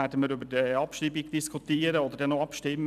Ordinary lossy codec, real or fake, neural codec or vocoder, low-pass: none; fake; vocoder, 44.1 kHz, 128 mel bands every 256 samples, BigVGAN v2; 14.4 kHz